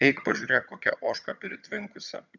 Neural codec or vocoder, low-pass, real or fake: vocoder, 22.05 kHz, 80 mel bands, HiFi-GAN; 7.2 kHz; fake